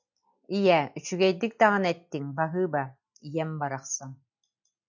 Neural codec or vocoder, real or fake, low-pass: none; real; 7.2 kHz